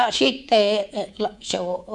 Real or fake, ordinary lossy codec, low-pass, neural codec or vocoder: fake; none; none; codec, 24 kHz, 3.1 kbps, DualCodec